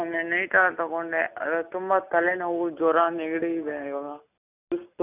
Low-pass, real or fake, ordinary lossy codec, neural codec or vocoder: 3.6 kHz; real; none; none